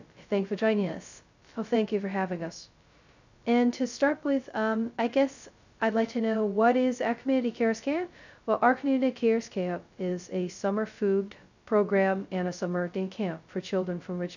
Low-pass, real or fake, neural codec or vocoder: 7.2 kHz; fake; codec, 16 kHz, 0.2 kbps, FocalCodec